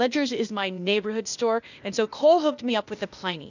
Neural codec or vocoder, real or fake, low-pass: codec, 16 kHz, 0.8 kbps, ZipCodec; fake; 7.2 kHz